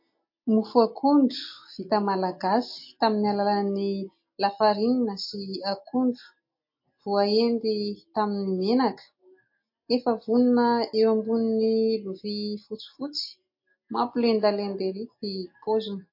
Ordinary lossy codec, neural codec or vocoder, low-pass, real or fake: MP3, 32 kbps; none; 5.4 kHz; real